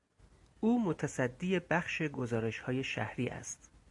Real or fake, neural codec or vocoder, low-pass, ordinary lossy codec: real; none; 10.8 kHz; AAC, 64 kbps